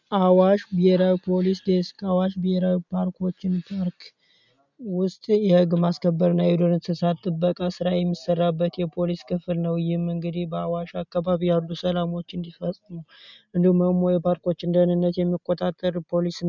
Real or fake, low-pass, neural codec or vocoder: real; 7.2 kHz; none